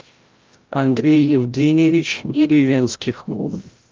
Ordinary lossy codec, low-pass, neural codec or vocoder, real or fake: Opus, 32 kbps; 7.2 kHz; codec, 16 kHz, 0.5 kbps, FreqCodec, larger model; fake